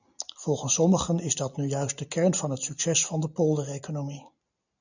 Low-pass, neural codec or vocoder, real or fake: 7.2 kHz; none; real